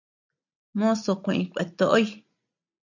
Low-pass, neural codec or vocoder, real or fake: 7.2 kHz; none; real